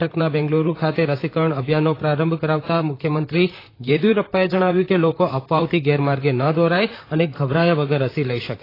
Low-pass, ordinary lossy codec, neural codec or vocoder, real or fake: 5.4 kHz; AAC, 24 kbps; vocoder, 44.1 kHz, 128 mel bands, Pupu-Vocoder; fake